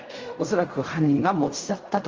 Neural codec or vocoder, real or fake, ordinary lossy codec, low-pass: codec, 16 kHz in and 24 kHz out, 0.4 kbps, LongCat-Audio-Codec, fine tuned four codebook decoder; fake; Opus, 32 kbps; 7.2 kHz